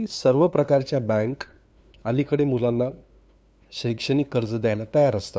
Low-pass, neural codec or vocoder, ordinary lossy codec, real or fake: none; codec, 16 kHz, 2 kbps, FunCodec, trained on LibriTTS, 25 frames a second; none; fake